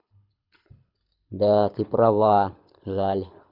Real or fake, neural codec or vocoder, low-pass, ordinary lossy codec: fake; codec, 24 kHz, 6 kbps, HILCodec; 5.4 kHz; none